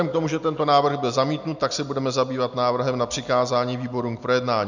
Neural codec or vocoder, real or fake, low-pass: none; real; 7.2 kHz